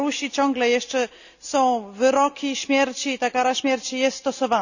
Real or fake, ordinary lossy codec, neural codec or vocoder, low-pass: real; none; none; 7.2 kHz